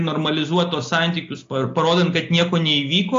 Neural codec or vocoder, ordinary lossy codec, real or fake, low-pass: none; AAC, 48 kbps; real; 7.2 kHz